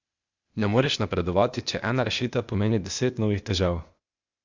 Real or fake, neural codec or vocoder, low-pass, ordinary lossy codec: fake; codec, 16 kHz, 0.8 kbps, ZipCodec; 7.2 kHz; Opus, 64 kbps